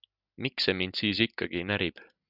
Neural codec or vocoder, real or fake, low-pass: none; real; 5.4 kHz